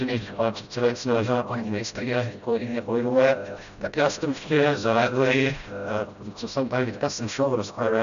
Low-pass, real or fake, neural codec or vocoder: 7.2 kHz; fake; codec, 16 kHz, 0.5 kbps, FreqCodec, smaller model